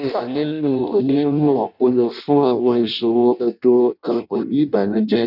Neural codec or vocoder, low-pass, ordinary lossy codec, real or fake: codec, 16 kHz in and 24 kHz out, 0.6 kbps, FireRedTTS-2 codec; 5.4 kHz; none; fake